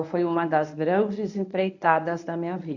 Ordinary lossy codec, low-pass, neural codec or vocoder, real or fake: none; 7.2 kHz; codec, 24 kHz, 0.9 kbps, WavTokenizer, medium speech release version 1; fake